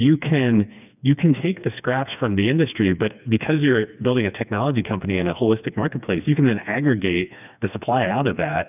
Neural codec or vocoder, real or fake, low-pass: codec, 16 kHz, 2 kbps, FreqCodec, smaller model; fake; 3.6 kHz